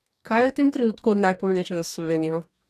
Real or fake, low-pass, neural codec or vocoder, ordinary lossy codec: fake; 14.4 kHz; codec, 44.1 kHz, 2.6 kbps, DAC; none